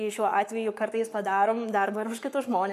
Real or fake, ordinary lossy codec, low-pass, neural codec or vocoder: fake; MP3, 96 kbps; 14.4 kHz; codec, 44.1 kHz, 7.8 kbps, Pupu-Codec